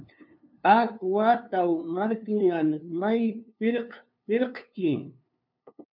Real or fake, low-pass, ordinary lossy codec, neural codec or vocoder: fake; 5.4 kHz; MP3, 48 kbps; codec, 16 kHz, 2 kbps, FunCodec, trained on LibriTTS, 25 frames a second